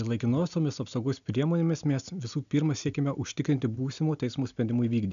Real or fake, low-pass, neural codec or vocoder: real; 7.2 kHz; none